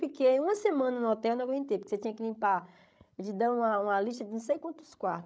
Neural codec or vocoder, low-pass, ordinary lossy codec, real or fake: codec, 16 kHz, 16 kbps, FreqCodec, larger model; none; none; fake